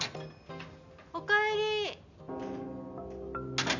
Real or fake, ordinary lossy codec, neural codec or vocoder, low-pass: real; none; none; 7.2 kHz